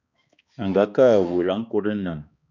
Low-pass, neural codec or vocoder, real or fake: 7.2 kHz; codec, 16 kHz, 2 kbps, X-Codec, HuBERT features, trained on balanced general audio; fake